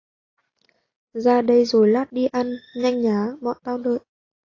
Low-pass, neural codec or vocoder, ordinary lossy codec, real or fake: 7.2 kHz; none; AAC, 32 kbps; real